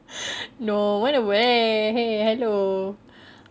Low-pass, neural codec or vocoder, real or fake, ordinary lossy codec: none; none; real; none